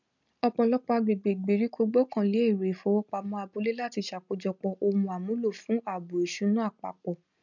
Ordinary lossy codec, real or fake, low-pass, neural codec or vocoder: none; real; 7.2 kHz; none